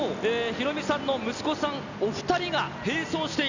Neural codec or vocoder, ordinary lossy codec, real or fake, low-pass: none; none; real; 7.2 kHz